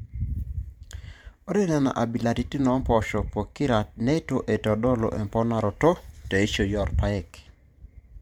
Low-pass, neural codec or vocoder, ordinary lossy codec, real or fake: 19.8 kHz; vocoder, 44.1 kHz, 128 mel bands every 512 samples, BigVGAN v2; MP3, 96 kbps; fake